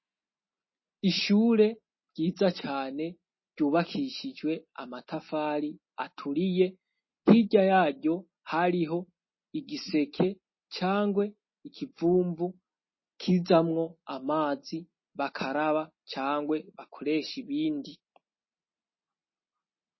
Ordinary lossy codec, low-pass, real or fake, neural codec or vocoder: MP3, 24 kbps; 7.2 kHz; real; none